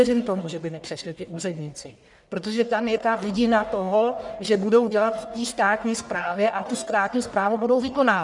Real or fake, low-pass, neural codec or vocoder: fake; 10.8 kHz; codec, 44.1 kHz, 1.7 kbps, Pupu-Codec